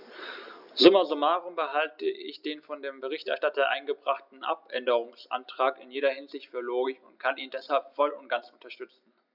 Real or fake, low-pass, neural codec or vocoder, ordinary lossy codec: real; 5.4 kHz; none; none